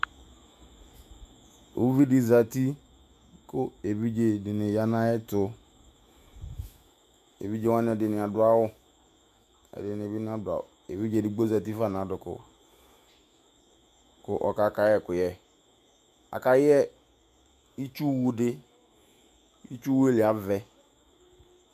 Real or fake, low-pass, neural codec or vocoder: fake; 14.4 kHz; autoencoder, 48 kHz, 128 numbers a frame, DAC-VAE, trained on Japanese speech